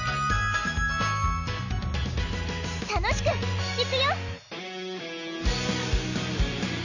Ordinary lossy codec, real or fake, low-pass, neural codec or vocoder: none; real; 7.2 kHz; none